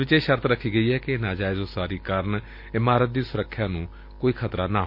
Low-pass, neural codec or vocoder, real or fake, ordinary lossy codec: 5.4 kHz; none; real; MP3, 32 kbps